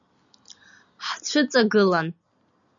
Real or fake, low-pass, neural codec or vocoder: real; 7.2 kHz; none